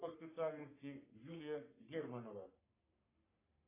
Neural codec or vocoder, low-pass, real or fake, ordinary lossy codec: codec, 44.1 kHz, 3.4 kbps, Pupu-Codec; 3.6 kHz; fake; AAC, 16 kbps